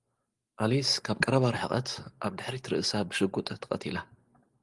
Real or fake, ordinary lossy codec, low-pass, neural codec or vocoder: real; Opus, 24 kbps; 10.8 kHz; none